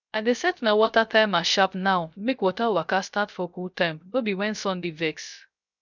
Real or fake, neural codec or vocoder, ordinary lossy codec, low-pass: fake; codec, 16 kHz, 0.3 kbps, FocalCodec; none; 7.2 kHz